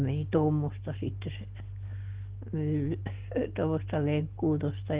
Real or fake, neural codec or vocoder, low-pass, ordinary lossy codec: real; none; 3.6 kHz; Opus, 16 kbps